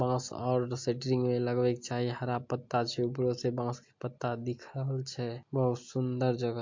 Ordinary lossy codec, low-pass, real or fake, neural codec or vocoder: MP3, 48 kbps; 7.2 kHz; real; none